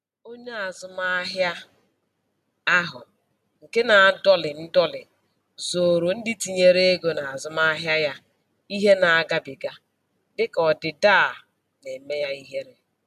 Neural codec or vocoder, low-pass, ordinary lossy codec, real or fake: none; 14.4 kHz; none; real